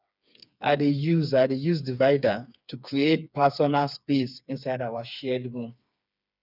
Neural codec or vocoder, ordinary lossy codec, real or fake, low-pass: codec, 16 kHz, 4 kbps, FreqCodec, smaller model; none; fake; 5.4 kHz